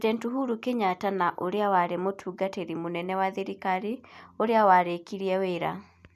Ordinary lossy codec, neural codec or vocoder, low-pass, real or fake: none; none; 14.4 kHz; real